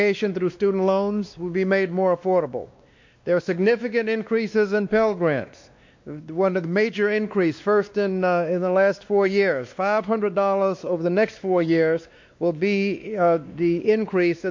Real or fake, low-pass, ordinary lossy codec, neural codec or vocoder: fake; 7.2 kHz; MP3, 48 kbps; codec, 16 kHz, 2 kbps, X-Codec, WavLM features, trained on Multilingual LibriSpeech